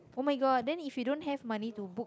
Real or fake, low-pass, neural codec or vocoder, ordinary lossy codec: real; none; none; none